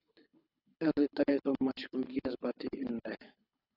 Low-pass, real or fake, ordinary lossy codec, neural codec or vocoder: 5.4 kHz; fake; AAC, 24 kbps; codec, 24 kHz, 6 kbps, HILCodec